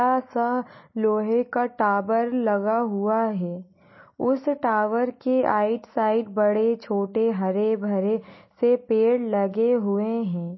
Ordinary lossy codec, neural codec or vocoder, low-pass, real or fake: MP3, 24 kbps; none; 7.2 kHz; real